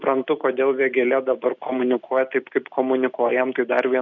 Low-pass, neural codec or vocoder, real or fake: 7.2 kHz; none; real